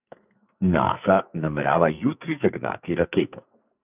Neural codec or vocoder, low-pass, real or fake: codec, 44.1 kHz, 2.6 kbps, SNAC; 3.6 kHz; fake